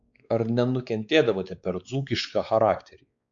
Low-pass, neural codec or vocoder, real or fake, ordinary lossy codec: 7.2 kHz; codec, 16 kHz, 4 kbps, X-Codec, WavLM features, trained on Multilingual LibriSpeech; fake; MP3, 64 kbps